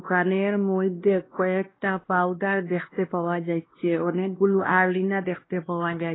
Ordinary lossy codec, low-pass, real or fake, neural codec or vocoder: AAC, 16 kbps; 7.2 kHz; fake; codec, 16 kHz, 1 kbps, X-Codec, WavLM features, trained on Multilingual LibriSpeech